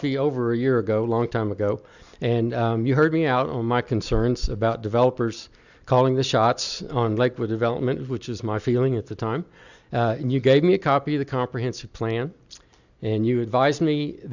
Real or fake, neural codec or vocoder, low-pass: real; none; 7.2 kHz